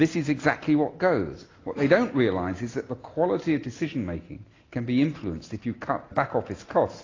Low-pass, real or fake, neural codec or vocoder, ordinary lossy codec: 7.2 kHz; real; none; AAC, 32 kbps